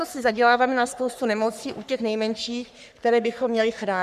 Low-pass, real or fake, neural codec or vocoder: 14.4 kHz; fake; codec, 44.1 kHz, 3.4 kbps, Pupu-Codec